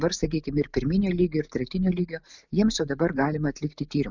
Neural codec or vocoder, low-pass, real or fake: none; 7.2 kHz; real